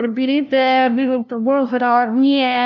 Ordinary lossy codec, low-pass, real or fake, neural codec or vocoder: none; 7.2 kHz; fake; codec, 16 kHz, 0.5 kbps, FunCodec, trained on LibriTTS, 25 frames a second